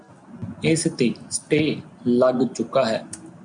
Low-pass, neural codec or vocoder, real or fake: 9.9 kHz; none; real